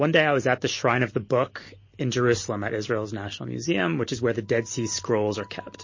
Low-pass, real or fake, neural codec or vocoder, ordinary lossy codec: 7.2 kHz; real; none; MP3, 32 kbps